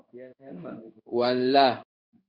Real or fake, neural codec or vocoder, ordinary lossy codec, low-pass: fake; codec, 16 kHz in and 24 kHz out, 1 kbps, XY-Tokenizer; Opus, 64 kbps; 5.4 kHz